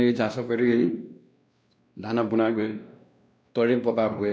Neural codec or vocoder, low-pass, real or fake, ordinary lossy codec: codec, 16 kHz, 1 kbps, X-Codec, WavLM features, trained on Multilingual LibriSpeech; none; fake; none